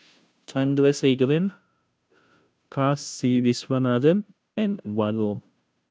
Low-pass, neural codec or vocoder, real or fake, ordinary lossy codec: none; codec, 16 kHz, 0.5 kbps, FunCodec, trained on Chinese and English, 25 frames a second; fake; none